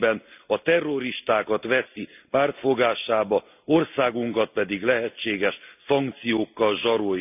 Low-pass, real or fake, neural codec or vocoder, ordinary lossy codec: 3.6 kHz; real; none; none